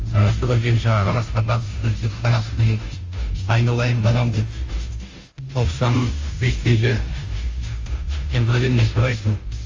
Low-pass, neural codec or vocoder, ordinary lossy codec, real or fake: 7.2 kHz; codec, 16 kHz, 0.5 kbps, FunCodec, trained on Chinese and English, 25 frames a second; Opus, 32 kbps; fake